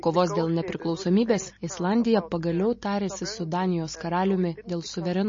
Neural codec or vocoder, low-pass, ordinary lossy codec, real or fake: none; 7.2 kHz; MP3, 32 kbps; real